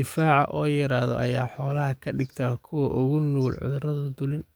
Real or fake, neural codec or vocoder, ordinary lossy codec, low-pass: fake; codec, 44.1 kHz, 7.8 kbps, DAC; none; none